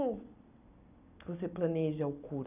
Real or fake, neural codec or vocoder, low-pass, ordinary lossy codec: real; none; 3.6 kHz; none